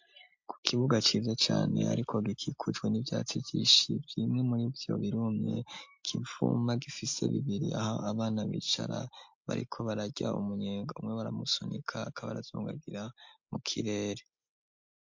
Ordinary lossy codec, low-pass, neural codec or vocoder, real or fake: MP3, 48 kbps; 7.2 kHz; none; real